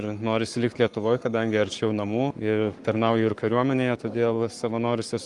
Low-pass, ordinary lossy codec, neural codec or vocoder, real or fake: 10.8 kHz; Opus, 32 kbps; codec, 44.1 kHz, 7.8 kbps, Pupu-Codec; fake